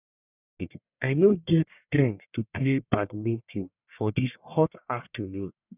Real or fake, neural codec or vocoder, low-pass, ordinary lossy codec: fake; codec, 44.1 kHz, 1.7 kbps, Pupu-Codec; 3.6 kHz; none